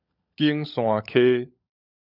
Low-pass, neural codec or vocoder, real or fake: 5.4 kHz; codec, 16 kHz, 16 kbps, FunCodec, trained on LibriTTS, 50 frames a second; fake